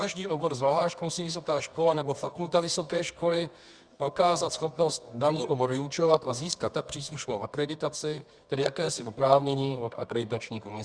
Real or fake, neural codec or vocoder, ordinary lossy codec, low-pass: fake; codec, 24 kHz, 0.9 kbps, WavTokenizer, medium music audio release; Opus, 64 kbps; 9.9 kHz